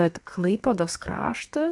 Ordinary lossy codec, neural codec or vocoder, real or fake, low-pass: AAC, 64 kbps; codec, 32 kHz, 1.9 kbps, SNAC; fake; 10.8 kHz